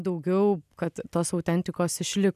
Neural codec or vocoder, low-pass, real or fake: none; 14.4 kHz; real